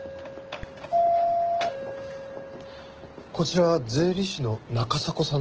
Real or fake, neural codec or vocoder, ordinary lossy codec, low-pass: real; none; Opus, 16 kbps; 7.2 kHz